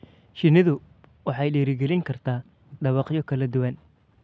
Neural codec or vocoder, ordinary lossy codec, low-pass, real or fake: none; none; none; real